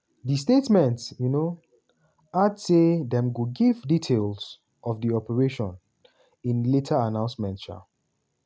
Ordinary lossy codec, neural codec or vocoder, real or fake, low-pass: none; none; real; none